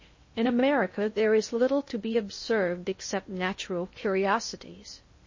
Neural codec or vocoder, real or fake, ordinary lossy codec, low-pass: codec, 16 kHz in and 24 kHz out, 0.6 kbps, FocalCodec, streaming, 2048 codes; fake; MP3, 32 kbps; 7.2 kHz